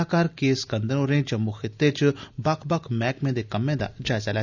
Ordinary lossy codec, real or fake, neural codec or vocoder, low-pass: none; real; none; 7.2 kHz